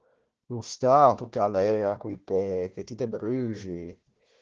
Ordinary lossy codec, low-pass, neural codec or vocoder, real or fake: Opus, 16 kbps; 7.2 kHz; codec, 16 kHz, 1 kbps, FunCodec, trained on Chinese and English, 50 frames a second; fake